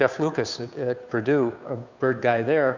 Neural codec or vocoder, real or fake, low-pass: codec, 16 kHz, 2 kbps, FunCodec, trained on Chinese and English, 25 frames a second; fake; 7.2 kHz